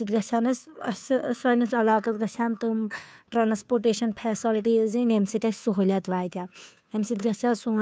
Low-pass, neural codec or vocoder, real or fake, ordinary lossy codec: none; codec, 16 kHz, 2 kbps, FunCodec, trained on Chinese and English, 25 frames a second; fake; none